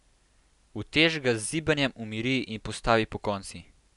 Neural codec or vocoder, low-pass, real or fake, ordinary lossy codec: none; 10.8 kHz; real; none